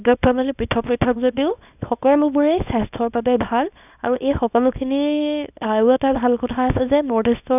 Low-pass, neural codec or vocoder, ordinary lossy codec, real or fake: 3.6 kHz; codec, 24 kHz, 0.9 kbps, WavTokenizer, small release; none; fake